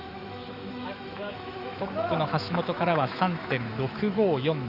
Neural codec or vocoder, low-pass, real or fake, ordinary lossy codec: autoencoder, 48 kHz, 128 numbers a frame, DAC-VAE, trained on Japanese speech; 5.4 kHz; fake; Opus, 64 kbps